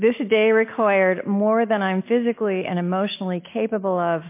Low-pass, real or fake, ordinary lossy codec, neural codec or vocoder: 3.6 kHz; real; MP3, 24 kbps; none